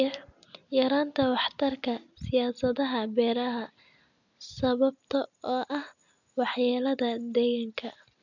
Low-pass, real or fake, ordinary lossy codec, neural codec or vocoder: 7.2 kHz; real; none; none